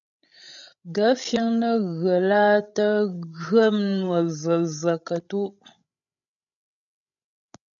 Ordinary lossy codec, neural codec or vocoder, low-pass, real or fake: MP3, 96 kbps; codec, 16 kHz, 16 kbps, FreqCodec, larger model; 7.2 kHz; fake